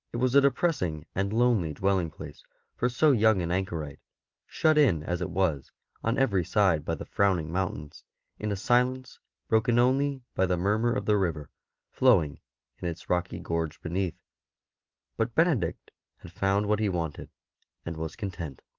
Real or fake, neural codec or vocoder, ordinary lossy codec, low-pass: real; none; Opus, 32 kbps; 7.2 kHz